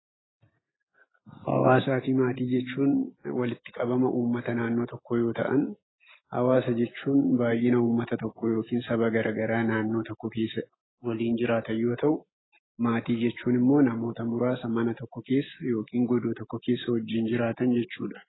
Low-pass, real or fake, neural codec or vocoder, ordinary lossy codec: 7.2 kHz; real; none; AAC, 16 kbps